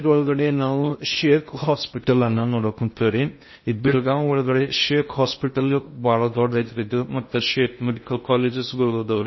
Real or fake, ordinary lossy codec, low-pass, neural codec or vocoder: fake; MP3, 24 kbps; 7.2 kHz; codec, 16 kHz in and 24 kHz out, 0.6 kbps, FocalCodec, streaming, 2048 codes